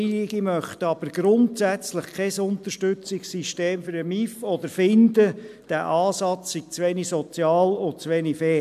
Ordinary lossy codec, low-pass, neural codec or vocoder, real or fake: AAC, 96 kbps; 14.4 kHz; none; real